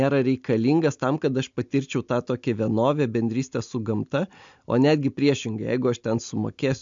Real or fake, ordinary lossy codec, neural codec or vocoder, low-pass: real; MP3, 64 kbps; none; 7.2 kHz